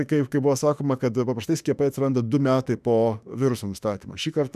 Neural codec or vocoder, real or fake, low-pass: autoencoder, 48 kHz, 32 numbers a frame, DAC-VAE, trained on Japanese speech; fake; 14.4 kHz